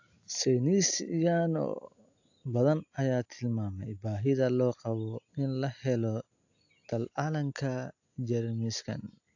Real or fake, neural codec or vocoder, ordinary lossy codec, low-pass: real; none; AAC, 48 kbps; 7.2 kHz